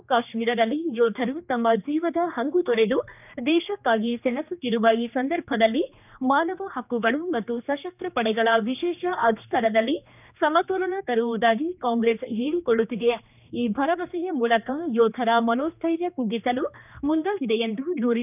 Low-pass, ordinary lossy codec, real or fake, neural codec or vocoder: 3.6 kHz; none; fake; codec, 16 kHz, 2 kbps, X-Codec, HuBERT features, trained on general audio